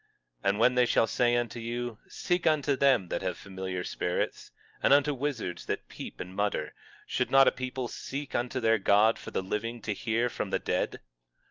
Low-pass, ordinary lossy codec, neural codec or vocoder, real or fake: 7.2 kHz; Opus, 24 kbps; none; real